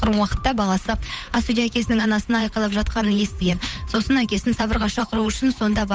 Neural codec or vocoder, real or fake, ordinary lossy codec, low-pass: codec, 16 kHz, 8 kbps, FunCodec, trained on Chinese and English, 25 frames a second; fake; none; none